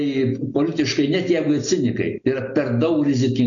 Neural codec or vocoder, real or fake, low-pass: none; real; 7.2 kHz